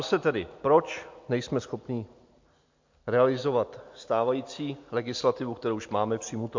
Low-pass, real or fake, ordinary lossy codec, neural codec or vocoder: 7.2 kHz; real; MP3, 48 kbps; none